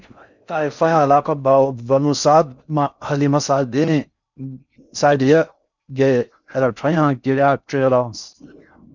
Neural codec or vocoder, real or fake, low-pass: codec, 16 kHz in and 24 kHz out, 0.6 kbps, FocalCodec, streaming, 4096 codes; fake; 7.2 kHz